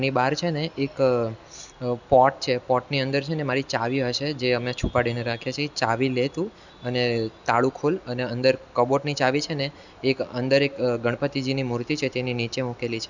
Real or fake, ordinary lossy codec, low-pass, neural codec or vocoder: real; none; 7.2 kHz; none